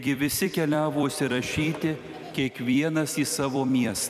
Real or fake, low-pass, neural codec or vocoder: fake; 14.4 kHz; vocoder, 44.1 kHz, 128 mel bands every 256 samples, BigVGAN v2